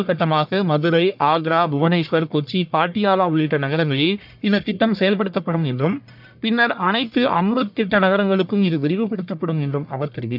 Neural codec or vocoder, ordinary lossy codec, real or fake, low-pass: codec, 44.1 kHz, 1.7 kbps, Pupu-Codec; none; fake; 5.4 kHz